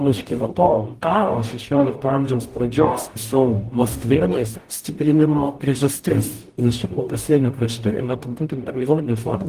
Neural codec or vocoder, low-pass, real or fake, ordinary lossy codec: codec, 44.1 kHz, 0.9 kbps, DAC; 14.4 kHz; fake; Opus, 24 kbps